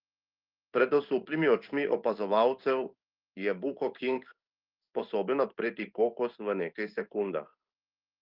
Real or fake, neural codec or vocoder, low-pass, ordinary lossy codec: fake; codec, 16 kHz in and 24 kHz out, 1 kbps, XY-Tokenizer; 5.4 kHz; Opus, 32 kbps